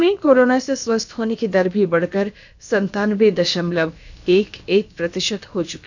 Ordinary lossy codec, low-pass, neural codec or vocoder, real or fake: none; 7.2 kHz; codec, 16 kHz, about 1 kbps, DyCAST, with the encoder's durations; fake